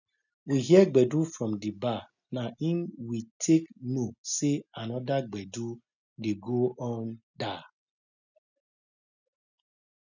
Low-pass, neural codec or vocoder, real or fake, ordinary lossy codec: 7.2 kHz; none; real; none